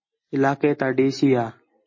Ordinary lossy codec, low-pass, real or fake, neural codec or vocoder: MP3, 32 kbps; 7.2 kHz; real; none